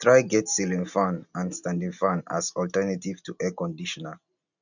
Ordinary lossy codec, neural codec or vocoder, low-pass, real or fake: none; none; 7.2 kHz; real